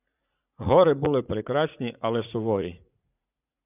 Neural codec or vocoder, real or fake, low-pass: vocoder, 44.1 kHz, 80 mel bands, Vocos; fake; 3.6 kHz